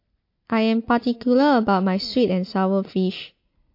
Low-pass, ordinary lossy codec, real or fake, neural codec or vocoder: 5.4 kHz; MP3, 32 kbps; real; none